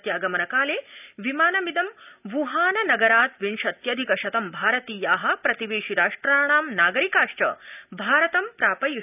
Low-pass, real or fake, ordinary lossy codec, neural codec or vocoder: 3.6 kHz; real; none; none